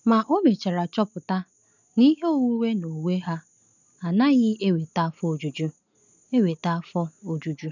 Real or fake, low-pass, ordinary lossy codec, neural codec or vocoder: real; 7.2 kHz; none; none